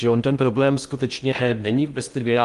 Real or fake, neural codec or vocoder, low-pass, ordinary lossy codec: fake; codec, 16 kHz in and 24 kHz out, 0.6 kbps, FocalCodec, streaming, 2048 codes; 10.8 kHz; Opus, 32 kbps